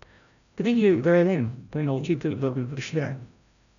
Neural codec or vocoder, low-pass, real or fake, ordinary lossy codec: codec, 16 kHz, 0.5 kbps, FreqCodec, larger model; 7.2 kHz; fake; none